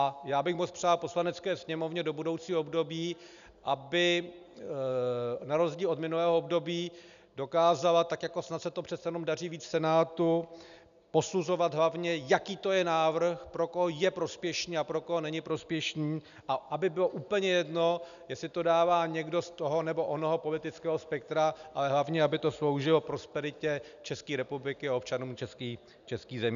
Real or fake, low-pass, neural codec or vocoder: real; 7.2 kHz; none